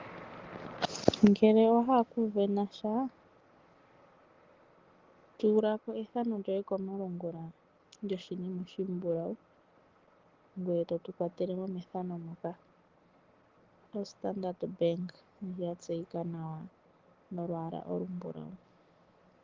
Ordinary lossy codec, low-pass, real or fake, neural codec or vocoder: Opus, 16 kbps; 7.2 kHz; real; none